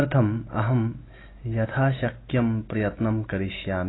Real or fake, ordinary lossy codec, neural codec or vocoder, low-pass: real; AAC, 16 kbps; none; 7.2 kHz